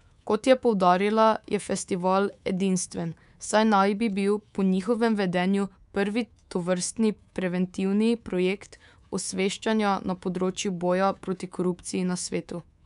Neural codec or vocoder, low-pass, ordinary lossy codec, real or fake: codec, 24 kHz, 3.1 kbps, DualCodec; 10.8 kHz; none; fake